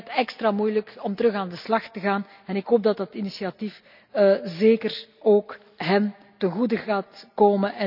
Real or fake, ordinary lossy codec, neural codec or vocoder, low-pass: real; none; none; 5.4 kHz